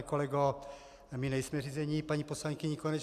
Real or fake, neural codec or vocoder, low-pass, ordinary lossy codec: real; none; 14.4 kHz; Opus, 64 kbps